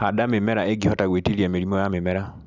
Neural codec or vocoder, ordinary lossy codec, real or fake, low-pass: none; none; real; 7.2 kHz